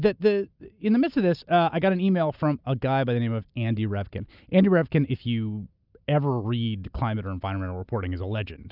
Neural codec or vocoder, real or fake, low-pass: none; real; 5.4 kHz